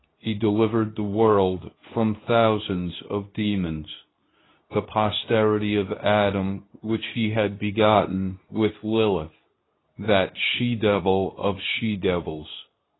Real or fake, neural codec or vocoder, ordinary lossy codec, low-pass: fake; codec, 24 kHz, 0.9 kbps, WavTokenizer, medium speech release version 2; AAC, 16 kbps; 7.2 kHz